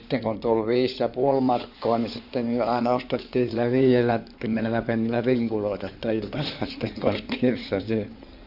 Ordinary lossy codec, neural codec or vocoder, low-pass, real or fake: none; codec, 16 kHz in and 24 kHz out, 2.2 kbps, FireRedTTS-2 codec; 5.4 kHz; fake